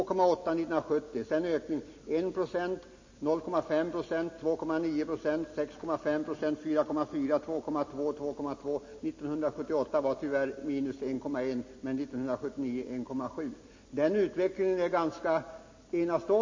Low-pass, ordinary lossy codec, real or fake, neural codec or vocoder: 7.2 kHz; MP3, 32 kbps; real; none